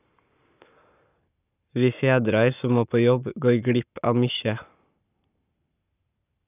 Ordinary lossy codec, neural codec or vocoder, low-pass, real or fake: none; codec, 44.1 kHz, 7.8 kbps, Pupu-Codec; 3.6 kHz; fake